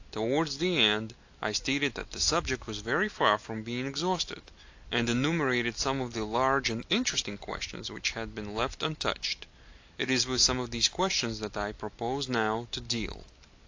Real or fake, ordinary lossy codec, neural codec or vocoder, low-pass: real; AAC, 48 kbps; none; 7.2 kHz